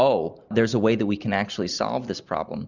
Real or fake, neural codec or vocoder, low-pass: real; none; 7.2 kHz